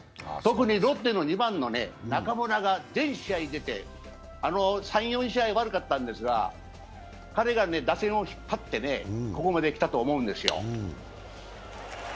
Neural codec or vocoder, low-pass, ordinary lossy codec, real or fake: none; none; none; real